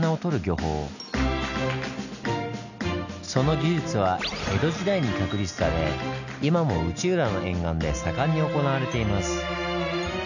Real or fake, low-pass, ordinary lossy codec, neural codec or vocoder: real; 7.2 kHz; none; none